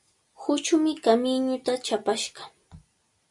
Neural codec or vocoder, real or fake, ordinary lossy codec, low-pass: none; real; MP3, 96 kbps; 10.8 kHz